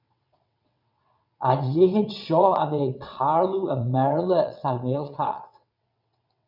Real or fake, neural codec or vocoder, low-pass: fake; vocoder, 22.05 kHz, 80 mel bands, WaveNeXt; 5.4 kHz